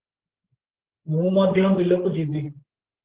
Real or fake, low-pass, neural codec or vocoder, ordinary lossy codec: fake; 3.6 kHz; codec, 16 kHz, 16 kbps, FreqCodec, larger model; Opus, 16 kbps